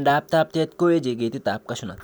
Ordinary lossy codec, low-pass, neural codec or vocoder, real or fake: none; none; none; real